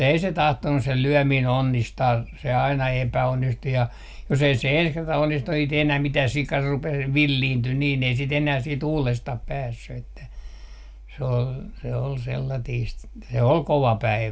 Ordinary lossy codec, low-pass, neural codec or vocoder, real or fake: none; none; none; real